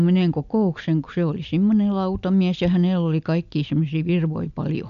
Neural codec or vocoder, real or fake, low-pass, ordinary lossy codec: none; real; 7.2 kHz; none